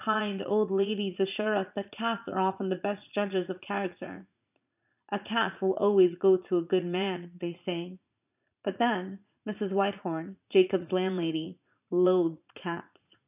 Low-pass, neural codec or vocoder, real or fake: 3.6 kHz; vocoder, 44.1 kHz, 80 mel bands, Vocos; fake